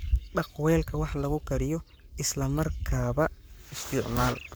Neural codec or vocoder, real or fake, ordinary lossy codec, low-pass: codec, 44.1 kHz, 7.8 kbps, Pupu-Codec; fake; none; none